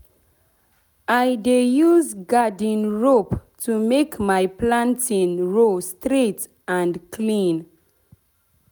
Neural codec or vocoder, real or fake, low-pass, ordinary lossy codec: none; real; none; none